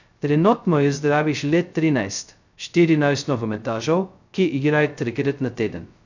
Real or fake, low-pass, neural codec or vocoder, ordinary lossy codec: fake; 7.2 kHz; codec, 16 kHz, 0.2 kbps, FocalCodec; none